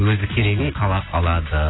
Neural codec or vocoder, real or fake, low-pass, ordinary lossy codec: none; real; 7.2 kHz; AAC, 16 kbps